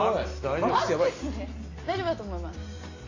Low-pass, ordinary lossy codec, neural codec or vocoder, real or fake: 7.2 kHz; AAC, 32 kbps; none; real